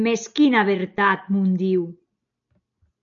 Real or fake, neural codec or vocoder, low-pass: real; none; 7.2 kHz